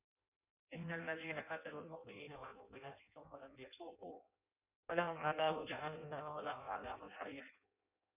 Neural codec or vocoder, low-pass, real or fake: codec, 16 kHz in and 24 kHz out, 0.6 kbps, FireRedTTS-2 codec; 3.6 kHz; fake